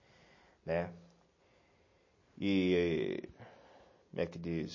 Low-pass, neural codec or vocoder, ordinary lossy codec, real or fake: 7.2 kHz; none; MP3, 32 kbps; real